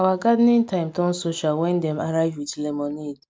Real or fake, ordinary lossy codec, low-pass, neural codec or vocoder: real; none; none; none